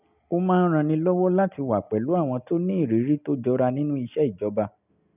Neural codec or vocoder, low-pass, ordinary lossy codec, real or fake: none; 3.6 kHz; none; real